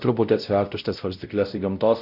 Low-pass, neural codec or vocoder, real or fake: 5.4 kHz; codec, 16 kHz, 0.5 kbps, X-Codec, WavLM features, trained on Multilingual LibriSpeech; fake